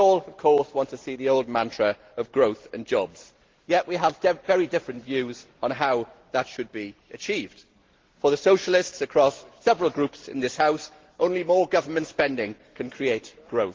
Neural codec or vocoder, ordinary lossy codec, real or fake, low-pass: none; Opus, 16 kbps; real; 7.2 kHz